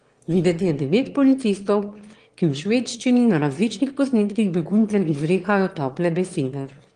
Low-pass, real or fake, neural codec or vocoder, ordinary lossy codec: 9.9 kHz; fake; autoencoder, 22.05 kHz, a latent of 192 numbers a frame, VITS, trained on one speaker; Opus, 24 kbps